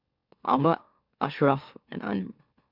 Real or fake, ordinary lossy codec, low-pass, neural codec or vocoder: fake; MP3, 48 kbps; 5.4 kHz; autoencoder, 44.1 kHz, a latent of 192 numbers a frame, MeloTTS